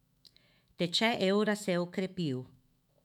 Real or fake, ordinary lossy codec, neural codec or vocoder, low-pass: fake; none; autoencoder, 48 kHz, 128 numbers a frame, DAC-VAE, trained on Japanese speech; 19.8 kHz